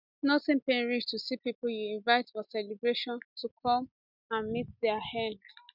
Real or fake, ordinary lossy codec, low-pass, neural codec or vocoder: real; none; 5.4 kHz; none